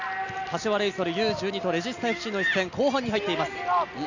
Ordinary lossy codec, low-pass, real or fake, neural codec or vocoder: none; 7.2 kHz; real; none